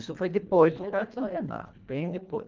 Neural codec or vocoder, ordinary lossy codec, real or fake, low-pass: codec, 24 kHz, 1.5 kbps, HILCodec; Opus, 32 kbps; fake; 7.2 kHz